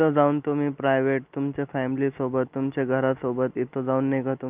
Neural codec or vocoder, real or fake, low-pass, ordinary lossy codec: none; real; 3.6 kHz; Opus, 24 kbps